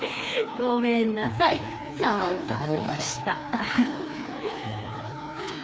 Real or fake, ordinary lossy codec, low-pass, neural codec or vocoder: fake; none; none; codec, 16 kHz, 2 kbps, FreqCodec, larger model